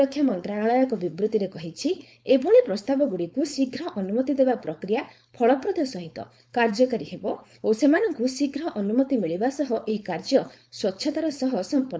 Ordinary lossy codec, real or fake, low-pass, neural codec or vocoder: none; fake; none; codec, 16 kHz, 4.8 kbps, FACodec